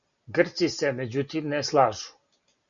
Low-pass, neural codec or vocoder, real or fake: 7.2 kHz; none; real